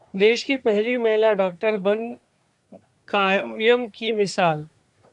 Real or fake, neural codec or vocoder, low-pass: fake; codec, 24 kHz, 1 kbps, SNAC; 10.8 kHz